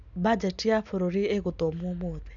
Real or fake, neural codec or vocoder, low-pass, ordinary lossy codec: real; none; 7.2 kHz; none